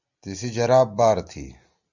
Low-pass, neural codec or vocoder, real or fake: 7.2 kHz; none; real